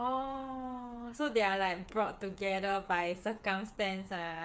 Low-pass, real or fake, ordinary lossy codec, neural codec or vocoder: none; fake; none; codec, 16 kHz, 8 kbps, FreqCodec, smaller model